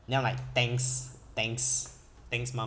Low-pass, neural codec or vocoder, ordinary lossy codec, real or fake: none; none; none; real